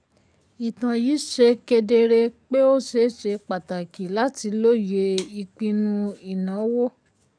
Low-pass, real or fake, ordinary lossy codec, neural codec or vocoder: 9.9 kHz; fake; none; codec, 44.1 kHz, 7.8 kbps, DAC